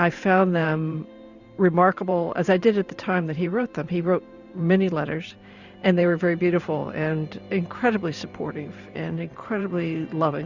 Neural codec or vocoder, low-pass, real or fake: vocoder, 44.1 kHz, 128 mel bands every 512 samples, BigVGAN v2; 7.2 kHz; fake